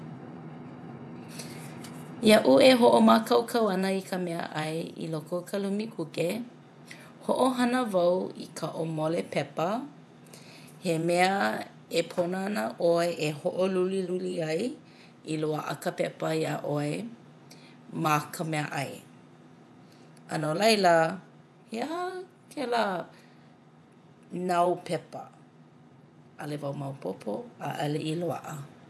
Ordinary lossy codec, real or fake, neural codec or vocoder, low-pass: none; fake; vocoder, 24 kHz, 100 mel bands, Vocos; none